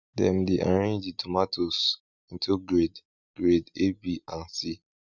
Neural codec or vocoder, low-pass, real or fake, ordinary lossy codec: none; 7.2 kHz; real; none